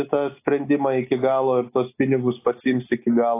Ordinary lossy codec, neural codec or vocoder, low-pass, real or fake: AAC, 24 kbps; none; 3.6 kHz; real